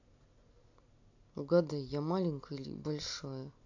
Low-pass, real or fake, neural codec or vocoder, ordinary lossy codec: 7.2 kHz; real; none; AAC, 48 kbps